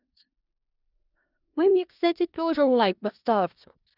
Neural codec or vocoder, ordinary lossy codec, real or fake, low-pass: codec, 16 kHz in and 24 kHz out, 0.4 kbps, LongCat-Audio-Codec, four codebook decoder; Opus, 64 kbps; fake; 5.4 kHz